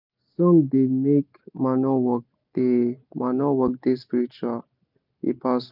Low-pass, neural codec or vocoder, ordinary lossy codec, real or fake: 5.4 kHz; none; none; real